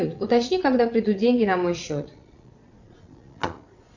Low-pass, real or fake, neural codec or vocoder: 7.2 kHz; fake; vocoder, 22.05 kHz, 80 mel bands, Vocos